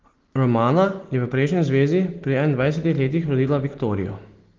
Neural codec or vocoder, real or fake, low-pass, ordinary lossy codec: none; real; 7.2 kHz; Opus, 16 kbps